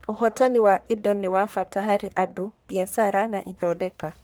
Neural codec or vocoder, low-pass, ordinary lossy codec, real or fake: codec, 44.1 kHz, 1.7 kbps, Pupu-Codec; none; none; fake